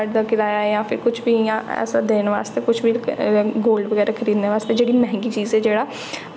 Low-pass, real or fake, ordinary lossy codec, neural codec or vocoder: none; real; none; none